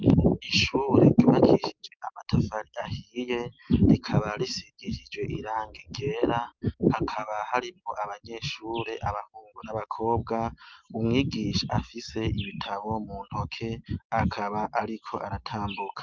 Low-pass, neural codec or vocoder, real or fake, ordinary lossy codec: 7.2 kHz; none; real; Opus, 24 kbps